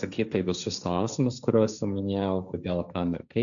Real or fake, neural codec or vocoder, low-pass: fake; codec, 16 kHz, 1.1 kbps, Voila-Tokenizer; 7.2 kHz